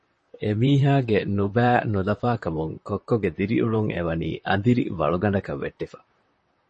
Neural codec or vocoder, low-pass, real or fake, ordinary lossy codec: vocoder, 44.1 kHz, 128 mel bands, Pupu-Vocoder; 10.8 kHz; fake; MP3, 32 kbps